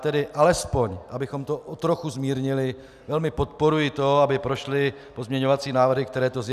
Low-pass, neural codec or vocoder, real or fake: 14.4 kHz; none; real